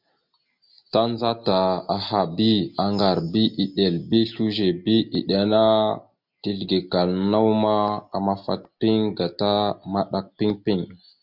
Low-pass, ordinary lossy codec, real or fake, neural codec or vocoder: 5.4 kHz; MP3, 48 kbps; real; none